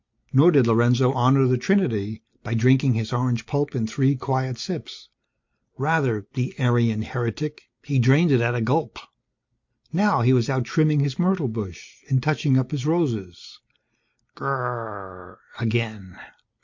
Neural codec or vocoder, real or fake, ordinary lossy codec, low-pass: none; real; MP3, 48 kbps; 7.2 kHz